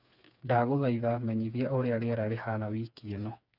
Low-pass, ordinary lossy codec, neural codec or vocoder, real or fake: 5.4 kHz; none; codec, 16 kHz, 4 kbps, FreqCodec, smaller model; fake